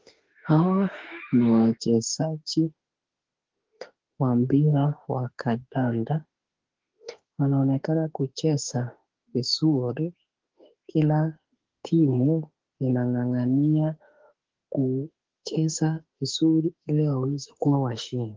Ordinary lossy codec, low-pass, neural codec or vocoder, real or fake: Opus, 16 kbps; 7.2 kHz; autoencoder, 48 kHz, 32 numbers a frame, DAC-VAE, trained on Japanese speech; fake